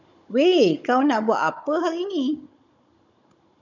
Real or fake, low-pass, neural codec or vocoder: fake; 7.2 kHz; codec, 16 kHz, 16 kbps, FunCodec, trained on Chinese and English, 50 frames a second